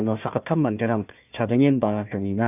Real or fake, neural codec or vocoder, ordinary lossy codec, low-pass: fake; codec, 16 kHz, 1 kbps, FunCodec, trained on Chinese and English, 50 frames a second; none; 3.6 kHz